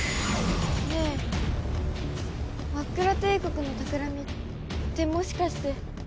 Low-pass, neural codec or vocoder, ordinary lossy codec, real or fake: none; none; none; real